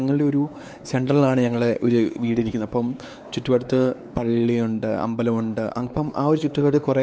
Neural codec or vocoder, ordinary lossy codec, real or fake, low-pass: codec, 16 kHz, 4 kbps, X-Codec, WavLM features, trained on Multilingual LibriSpeech; none; fake; none